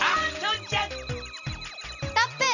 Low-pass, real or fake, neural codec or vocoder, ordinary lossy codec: 7.2 kHz; real; none; none